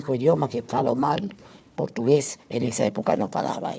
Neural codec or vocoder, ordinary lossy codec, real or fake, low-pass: codec, 16 kHz, 4 kbps, FunCodec, trained on Chinese and English, 50 frames a second; none; fake; none